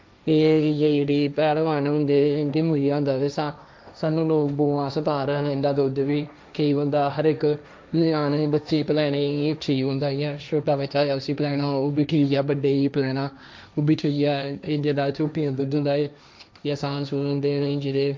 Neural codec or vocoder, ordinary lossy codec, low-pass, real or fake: codec, 16 kHz, 1.1 kbps, Voila-Tokenizer; none; none; fake